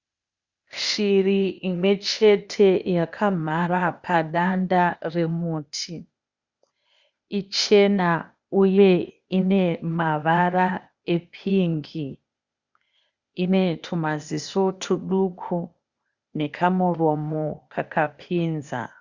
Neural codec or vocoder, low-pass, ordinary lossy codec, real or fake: codec, 16 kHz, 0.8 kbps, ZipCodec; 7.2 kHz; Opus, 64 kbps; fake